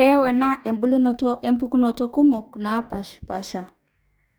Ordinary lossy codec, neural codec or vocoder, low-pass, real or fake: none; codec, 44.1 kHz, 2.6 kbps, DAC; none; fake